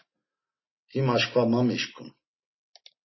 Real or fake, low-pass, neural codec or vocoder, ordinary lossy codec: real; 7.2 kHz; none; MP3, 24 kbps